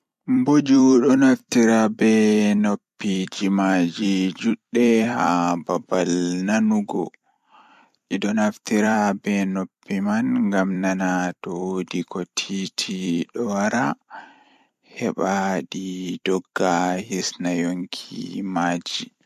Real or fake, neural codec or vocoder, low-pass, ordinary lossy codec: fake; vocoder, 44.1 kHz, 128 mel bands every 256 samples, BigVGAN v2; 14.4 kHz; MP3, 64 kbps